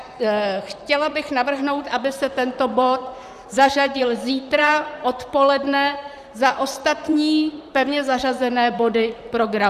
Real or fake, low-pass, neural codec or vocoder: fake; 14.4 kHz; vocoder, 44.1 kHz, 128 mel bands, Pupu-Vocoder